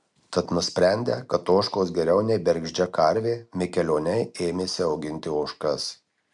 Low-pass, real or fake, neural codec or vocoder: 10.8 kHz; real; none